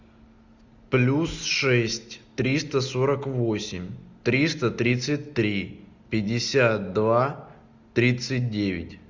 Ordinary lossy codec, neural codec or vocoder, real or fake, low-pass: Opus, 64 kbps; none; real; 7.2 kHz